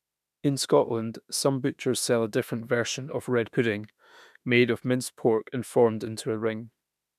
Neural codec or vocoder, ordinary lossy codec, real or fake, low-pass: autoencoder, 48 kHz, 32 numbers a frame, DAC-VAE, trained on Japanese speech; none; fake; 14.4 kHz